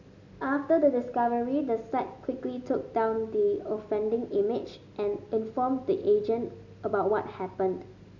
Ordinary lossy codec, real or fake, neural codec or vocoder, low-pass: none; real; none; 7.2 kHz